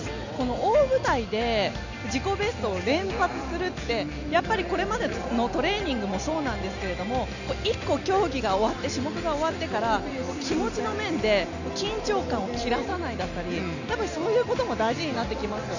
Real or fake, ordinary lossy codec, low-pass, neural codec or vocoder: real; none; 7.2 kHz; none